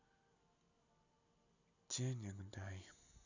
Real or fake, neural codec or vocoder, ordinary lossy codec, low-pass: real; none; none; 7.2 kHz